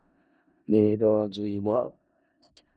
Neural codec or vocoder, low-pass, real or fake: codec, 16 kHz in and 24 kHz out, 0.4 kbps, LongCat-Audio-Codec, four codebook decoder; 9.9 kHz; fake